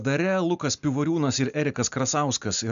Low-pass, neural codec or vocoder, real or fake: 7.2 kHz; none; real